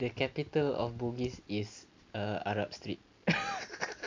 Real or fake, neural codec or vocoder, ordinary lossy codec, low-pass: real; none; MP3, 64 kbps; 7.2 kHz